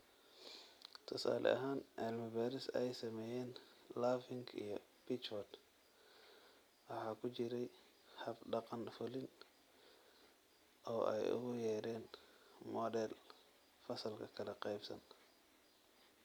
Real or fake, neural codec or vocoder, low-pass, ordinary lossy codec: real; none; none; none